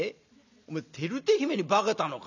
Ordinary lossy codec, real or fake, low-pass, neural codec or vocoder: none; real; 7.2 kHz; none